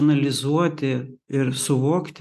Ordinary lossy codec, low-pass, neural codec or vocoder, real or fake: AAC, 96 kbps; 14.4 kHz; none; real